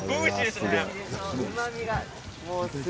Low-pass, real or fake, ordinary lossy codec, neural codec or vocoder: none; real; none; none